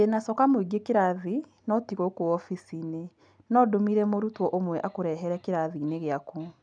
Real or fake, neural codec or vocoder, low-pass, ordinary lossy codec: real; none; 9.9 kHz; none